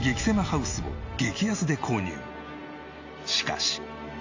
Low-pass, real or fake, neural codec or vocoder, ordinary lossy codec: 7.2 kHz; real; none; AAC, 48 kbps